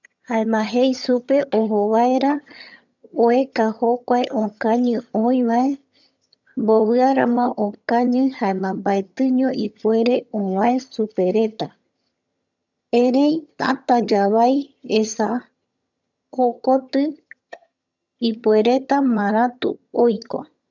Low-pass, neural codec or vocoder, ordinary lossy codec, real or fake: 7.2 kHz; vocoder, 22.05 kHz, 80 mel bands, HiFi-GAN; none; fake